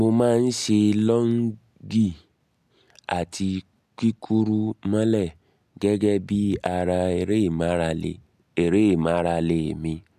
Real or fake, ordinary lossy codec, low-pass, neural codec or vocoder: real; MP3, 96 kbps; 14.4 kHz; none